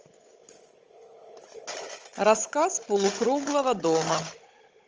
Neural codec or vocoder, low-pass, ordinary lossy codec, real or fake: codec, 16 kHz, 16 kbps, FunCodec, trained on Chinese and English, 50 frames a second; 7.2 kHz; Opus, 24 kbps; fake